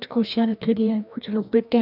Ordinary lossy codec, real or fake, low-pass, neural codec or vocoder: none; fake; 5.4 kHz; codec, 16 kHz, 1 kbps, FreqCodec, larger model